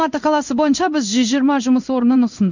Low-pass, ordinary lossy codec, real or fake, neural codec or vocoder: 7.2 kHz; none; fake; codec, 16 kHz in and 24 kHz out, 1 kbps, XY-Tokenizer